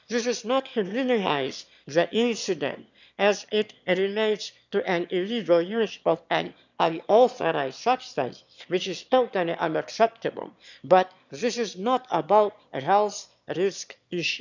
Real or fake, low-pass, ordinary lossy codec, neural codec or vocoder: fake; 7.2 kHz; none; autoencoder, 22.05 kHz, a latent of 192 numbers a frame, VITS, trained on one speaker